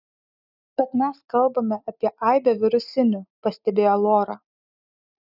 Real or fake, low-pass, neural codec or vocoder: real; 5.4 kHz; none